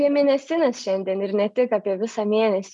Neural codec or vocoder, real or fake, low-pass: none; real; 10.8 kHz